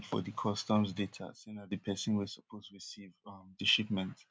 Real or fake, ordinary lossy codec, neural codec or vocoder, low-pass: real; none; none; none